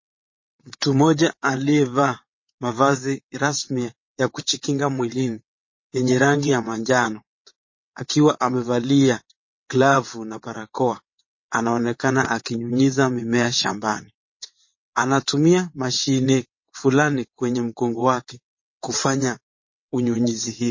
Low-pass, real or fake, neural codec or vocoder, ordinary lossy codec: 7.2 kHz; fake; vocoder, 22.05 kHz, 80 mel bands, WaveNeXt; MP3, 32 kbps